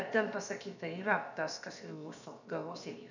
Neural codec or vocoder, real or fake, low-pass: codec, 16 kHz, about 1 kbps, DyCAST, with the encoder's durations; fake; 7.2 kHz